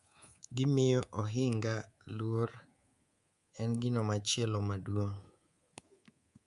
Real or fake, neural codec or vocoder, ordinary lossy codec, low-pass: fake; codec, 24 kHz, 3.1 kbps, DualCodec; Opus, 64 kbps; 10.8 kHz